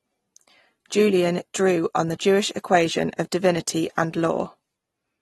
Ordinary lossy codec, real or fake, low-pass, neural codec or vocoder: AAC, 32 kbps; real; 19.8 kHz; none